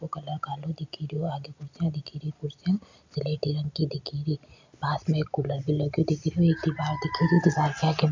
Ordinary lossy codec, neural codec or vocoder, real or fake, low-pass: MP3, 64 kbps; none; real; 7.2 kHz